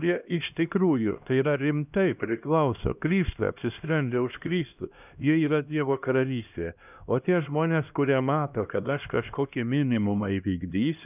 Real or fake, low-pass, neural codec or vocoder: fake; 3.6 kHz; codec, 16 kHz, 1 kbps, X-Codec, HuBERT features, trained on LibriSpeech